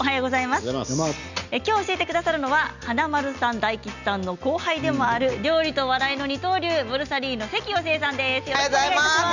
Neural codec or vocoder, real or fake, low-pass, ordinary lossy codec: none; real; 7.2 kHz; none